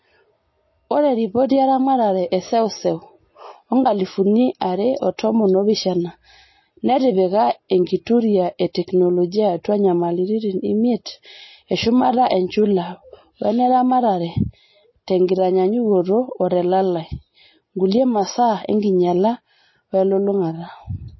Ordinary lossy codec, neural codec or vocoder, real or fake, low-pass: MP3, 24 kbps; none; real; 7.2 kHz